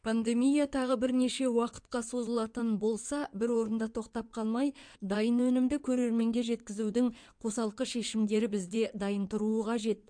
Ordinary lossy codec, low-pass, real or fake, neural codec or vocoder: MP3, 64 kbps; 9.9 kHz; fake; codec, 16 kHz in and 24 kHz out, 2.2 kbps, FireRedTTS-2 codec